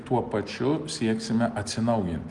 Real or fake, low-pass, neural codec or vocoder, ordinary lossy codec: real; 10.8 kHz; none; Opus, 24 kbps